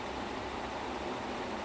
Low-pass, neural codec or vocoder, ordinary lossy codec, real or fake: none; none; none; real